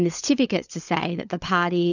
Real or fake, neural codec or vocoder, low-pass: fake; codec, 16 kHz, 16 kbps, FunCodec, trained on LibriTTS, 50 frames a second; 7.2 kHz